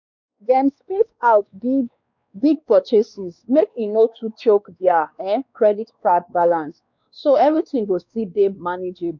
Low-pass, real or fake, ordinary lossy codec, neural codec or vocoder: 7.2 kHz; fake; none; codec, 16 kHz, 2 kbps, X-Codec, WavLM features, trained on Multilingual LibriSpeech